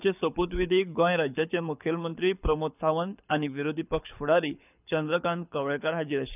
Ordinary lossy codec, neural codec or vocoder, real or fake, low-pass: none; codec, 24 kHz, 6 kbps, HILCodec; fake; 3.6 kHz